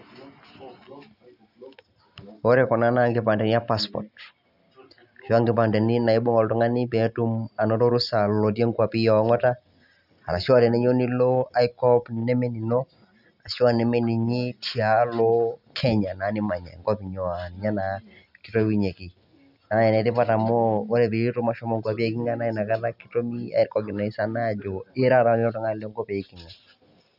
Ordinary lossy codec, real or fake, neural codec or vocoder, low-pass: none; real; none; 5.4 kHz